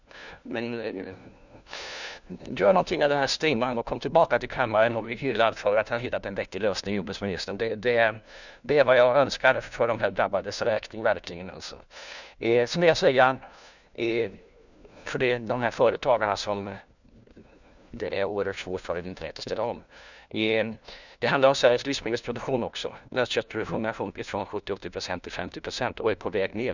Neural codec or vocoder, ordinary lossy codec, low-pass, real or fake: codec, 16 kHz, 1 kbps, FunCodec, trained on LibriTTS, 50 frames a second; none; 7.2 kHz; fake